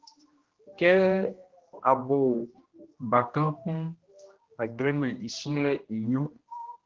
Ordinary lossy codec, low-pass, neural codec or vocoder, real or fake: Opus, 16 kbps; 7.2 kHz; codec, 16 kHz, 1 kbps, X-Codec, HuBERT features, trained on general audio; fake